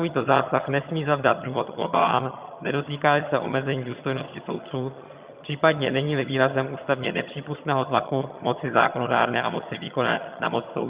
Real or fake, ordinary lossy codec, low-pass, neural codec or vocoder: fake; Opus, 64 kbps; 3.6 kHz; vocoder, 22.05 kHz, 80 mel bands, HiFi-GAN